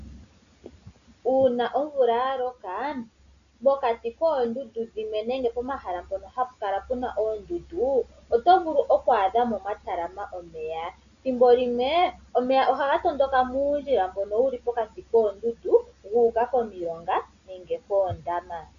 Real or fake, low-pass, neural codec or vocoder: real; 7.2 kHz; none